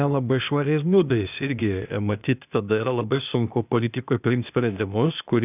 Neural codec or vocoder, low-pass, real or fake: codec, 16 kHz, 0.8 kbps, ZipCodec; 3.6 kHz; fake